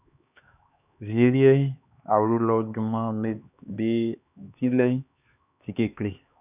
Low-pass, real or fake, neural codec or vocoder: 3.6 kHz; fake; codec, 16 kHz, 2 kbps, X-Codec, HuBERT features, trained on LibriSpeech